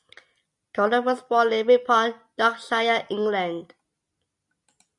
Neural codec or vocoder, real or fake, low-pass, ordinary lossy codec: none; real; 10.8 kHz; MP3, 96 kbps